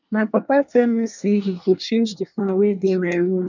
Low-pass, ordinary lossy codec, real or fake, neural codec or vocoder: 7.2 kHz; none; fake; codec, 24 kHz, 1 kbps, SNAC